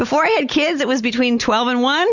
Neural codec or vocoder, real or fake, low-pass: none; real; 7.2 kHz